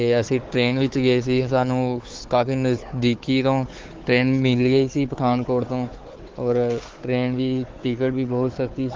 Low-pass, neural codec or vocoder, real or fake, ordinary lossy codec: 7.2 kHz; codec, 16 kHz, 4 kbps, FunCodec, trained on Chinese and English, 50 frames a second; fake; Opus, 16 kbps